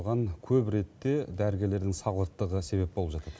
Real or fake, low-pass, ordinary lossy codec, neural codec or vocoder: real; none; none; none